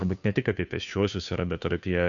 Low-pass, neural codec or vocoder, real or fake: 7.2 kHz; codec, 16 kHz, 2 kbps, FunCodec, trained on Chinese and English, 25 frames a second; fake